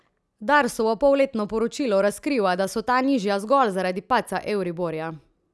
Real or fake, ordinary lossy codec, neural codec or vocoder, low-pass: real; none; none; none